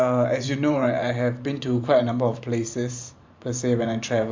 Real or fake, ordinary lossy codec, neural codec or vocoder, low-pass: fake; MP3, 64 kbps; vocoder, 44.1 kHz, 128 mel bands every 512 samples, BigVGAN v2; 7.2 kHz